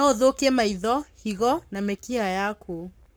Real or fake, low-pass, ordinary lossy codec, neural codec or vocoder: fake; none; none; codec, 44.1 kHz, 7.8 kbps, Pupu-Codec